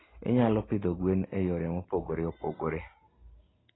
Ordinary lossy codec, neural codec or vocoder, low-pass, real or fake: AAC, 16 kbps; none; 7.2 kHz; real